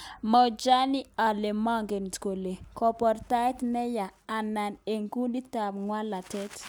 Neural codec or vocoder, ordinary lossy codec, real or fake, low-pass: none; none; real; none